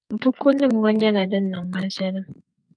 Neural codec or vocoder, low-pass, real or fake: codec, 44.1 kHz, 2.6 kbps, SNAC; 9.9 kHz; fake